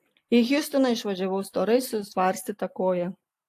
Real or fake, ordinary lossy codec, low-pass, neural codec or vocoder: real; AAC, 64 kbps; 14.4 kHz; none